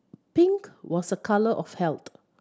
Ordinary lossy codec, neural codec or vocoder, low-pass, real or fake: none; none; none; real